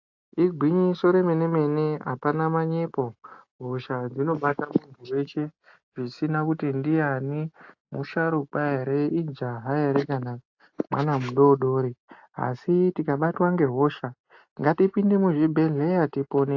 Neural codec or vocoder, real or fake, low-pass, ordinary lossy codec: none; real; 7.2 kHz; AAC, 48 kbps